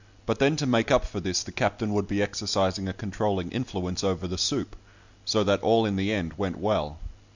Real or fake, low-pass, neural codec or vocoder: real; 7.2 kHz; none